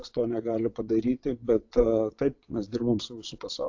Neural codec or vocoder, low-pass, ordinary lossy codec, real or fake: vocoder, 22.05 kHz, 80 mel bands, WaveNeXt; 7.2 kHz; AAC, 48 kbps; fake